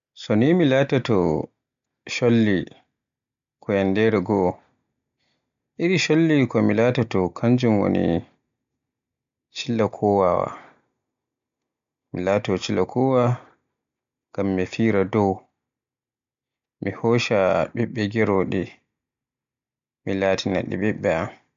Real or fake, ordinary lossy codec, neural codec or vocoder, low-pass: real; MP3, 64 kbps; none; 7.2 kHz